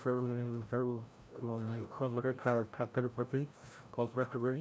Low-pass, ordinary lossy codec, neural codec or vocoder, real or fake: none; none; codec, 16 kHz, 0.5 kbps, FreqCodec, larger model; fake